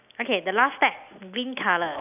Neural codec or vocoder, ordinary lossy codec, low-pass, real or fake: none; none; 3.6 kHz; real